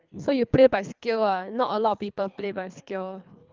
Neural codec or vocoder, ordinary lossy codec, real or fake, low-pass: codec, 24 kHz, 3 kbps, HILCodec; Opus, 32 kbps; fake; 7.2 kHz